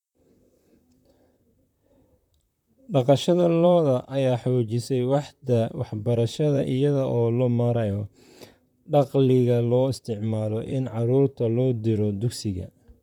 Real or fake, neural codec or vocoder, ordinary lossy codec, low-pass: fake; vocoder, 44.1 kHz, 128 mel bands, Pupu-Vocoder; none; 19.8 kHz